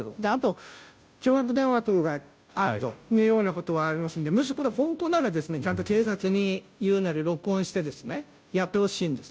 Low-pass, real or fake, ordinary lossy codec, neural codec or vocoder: none; fake; none; codec, 16 kHz, 0.5 kbps, FunCodec, trained on Chinese and English, 25 frames a second